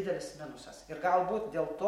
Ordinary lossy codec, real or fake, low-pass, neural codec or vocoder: MP3, 64 kbps; real; 19.8 kHz; none